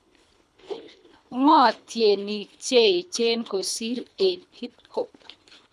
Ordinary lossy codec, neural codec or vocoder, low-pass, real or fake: none; codec, 24 kHz, 3 kbps, HILCodec; none; fake